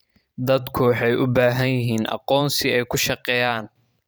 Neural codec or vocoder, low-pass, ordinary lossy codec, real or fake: vocoder, 44.1 kHz, 128 mel bands every 512 samples, BigVGAN v2; none; none; fake